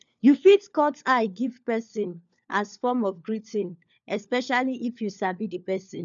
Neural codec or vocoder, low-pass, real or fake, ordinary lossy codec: codec, 16 kHz, 4 kbps, FunCodec, trained on LibriTTS, 50 frames a second; 7.2 kHz; fake; none